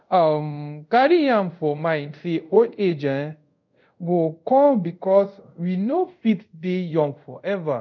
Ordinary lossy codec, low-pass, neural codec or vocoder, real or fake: none; 7.2 kHz; codec, 24 kHz, 0.5 kbps, DualCodec; fake